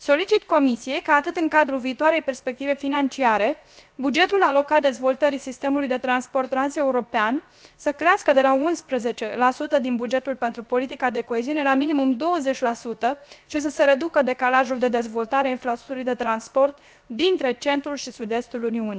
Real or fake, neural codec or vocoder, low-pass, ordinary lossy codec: fake; codec, 16 kHz, 0.7 kbps, FocalCodec; none; none